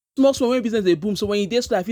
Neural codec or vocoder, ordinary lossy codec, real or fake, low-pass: none; none; real; none